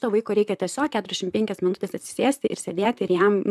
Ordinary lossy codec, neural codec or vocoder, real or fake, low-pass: MP3, 96 kbps; vocoder, 44.1 kHz, 128 mel bands, Pupu-Vocoder; fake; 14.4 kHz